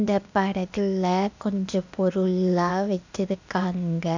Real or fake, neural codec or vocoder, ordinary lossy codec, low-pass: fake; codec, 16 kHz, 0.8 kbps, ZipCodec; none; 7.2 kHz